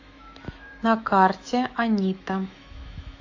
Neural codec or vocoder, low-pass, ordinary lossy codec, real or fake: autoencoder, 48 kHz, 128 numbers a frame, DAC-VAE, trained on Japanese speech; 7.2 kHz; AAC, 48 kbps; fake